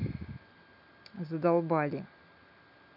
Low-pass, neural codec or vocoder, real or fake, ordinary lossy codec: 5.4 kHz; none; real; none